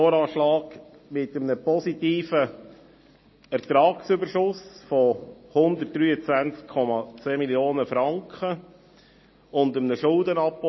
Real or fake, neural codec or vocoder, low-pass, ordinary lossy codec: real; none; 7.2 kHz; MP3, 24 kbps